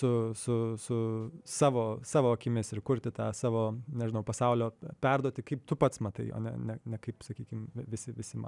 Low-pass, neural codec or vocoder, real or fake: 10.8 kHz; none; real